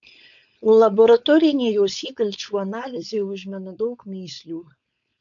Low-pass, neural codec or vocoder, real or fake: 7.2 kHz; codec, 16 kHz, 4.8 kbps, FACodec; fake